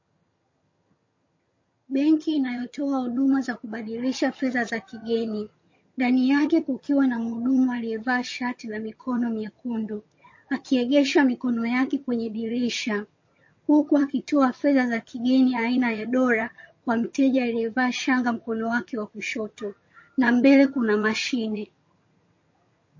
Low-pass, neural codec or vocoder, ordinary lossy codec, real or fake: 7.2 kHz; vocoder, 22.05 kHz, 80 mel bands, HiFi-GAN; MP3, 32 kbps; fake